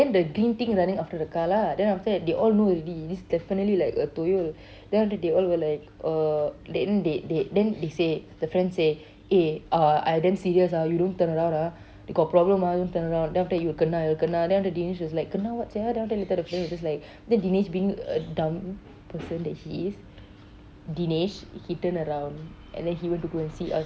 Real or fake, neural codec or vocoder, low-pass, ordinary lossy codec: real; none; none; none